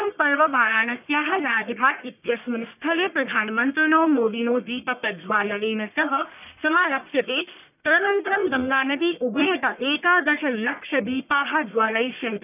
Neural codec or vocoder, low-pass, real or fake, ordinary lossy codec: codec, 44.1 kHz, 1.7 kbps, Pupu-Codec; 3.6 kHz; fake; none